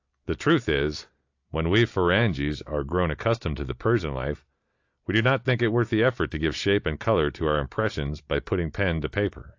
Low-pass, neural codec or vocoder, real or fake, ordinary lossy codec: 7.2 kHz; none; real; AAC, 48 kbps